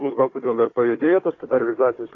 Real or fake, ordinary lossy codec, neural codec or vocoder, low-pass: fake; AAC, 32 kbps; codec, 16 kHz, 4 kbps, FunCodec, trained on Chinese and English, 50 frames a second; 7.2 kHz